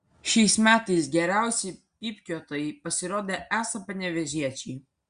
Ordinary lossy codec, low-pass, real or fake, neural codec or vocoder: Opus, 64 kbps; 9.9 kHz; real; none